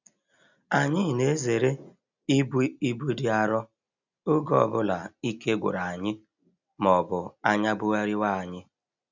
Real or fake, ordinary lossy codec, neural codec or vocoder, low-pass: real; none; none; 7.2 kHz